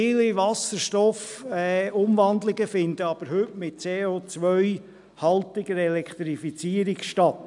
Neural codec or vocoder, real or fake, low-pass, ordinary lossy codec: none; real; 10.8 kHz; none